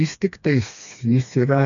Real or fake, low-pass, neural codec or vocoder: fake; 7.2 kHz; codec, 16 kHz, 2 kbps, FreqCodec, smaller model